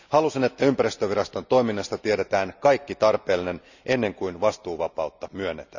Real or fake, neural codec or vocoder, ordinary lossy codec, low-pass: real; none; none; 7.2 kHz